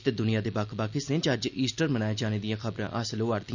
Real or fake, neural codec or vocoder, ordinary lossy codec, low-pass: real; none; none; 7.2 kHz